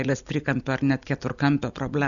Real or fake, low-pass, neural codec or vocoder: real; 7.2 kHz; none